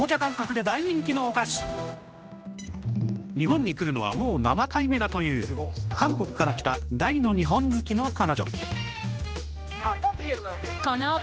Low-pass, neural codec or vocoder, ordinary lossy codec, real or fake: none; codec, 16 kHz, 1 kbps, X-Codec, HuBERT features, trained on general audio; none; fake